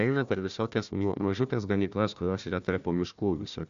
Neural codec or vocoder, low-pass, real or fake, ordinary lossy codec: codec, 16 kHz, 1 kbps, FunCodec, trained on Chinese and English, 50 frames a second; 7.2 kHz; fake; AAC, 96 kbps